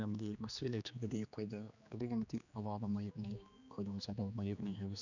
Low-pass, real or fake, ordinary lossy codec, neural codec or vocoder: 7.2 kHz; fake; none; codec, 16 kHz, 2 kbps, X-Codec, HuBERT features, trained on general audio